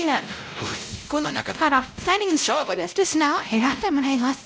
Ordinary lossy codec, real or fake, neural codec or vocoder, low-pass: none; fake; codec, 16 kHz, 0.5 kbps, X-Codec, WavLM features, trained on Multilingual LibriSpeech; none